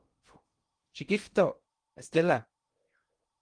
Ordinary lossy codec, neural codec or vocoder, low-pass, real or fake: Opus, 24 kbps; codec, 16 kHz in and 24 kHz out, 0.6 kbps, FocalCodec, streaming, 2048 codes; 9.9 kHz; fake